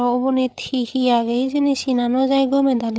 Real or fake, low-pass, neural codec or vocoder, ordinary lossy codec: fake; none; codec, 16 kHz, 8 kbps, FreqCodec, larger model; none